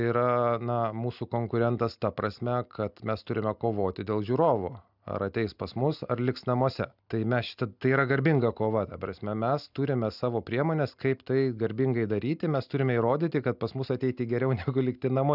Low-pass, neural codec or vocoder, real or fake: 5.4 kHz; none; real